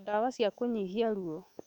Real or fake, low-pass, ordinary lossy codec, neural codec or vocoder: fake; 19.8 kHz; none; autoencoder, 48 kHz, 128 numbers a frame, DAC-VAE, trained on Japanese speech